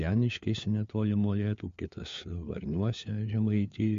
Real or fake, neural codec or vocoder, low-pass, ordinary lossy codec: fake; codec, 16 kHz, 4 kbps, FunCodec, trained on LibriTTS, 50 frames a second; 7.2 kHz; MP3, 48 kbps